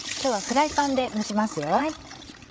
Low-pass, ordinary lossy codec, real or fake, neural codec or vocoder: none; none; fake; codec, 16 kHz, 16 kbps, FreqCodec, larger model